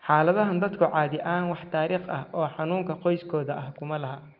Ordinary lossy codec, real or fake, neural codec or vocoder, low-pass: Opus, 32 kbps; real; none; 5.4 kHz